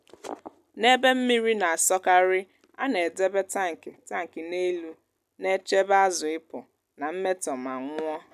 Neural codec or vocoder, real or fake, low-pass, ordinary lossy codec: none; real; 14.4 kHz; none